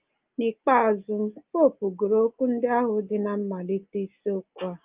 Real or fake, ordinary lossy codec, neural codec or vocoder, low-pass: real; Opus, 32 kbps; none; 3.6 kHz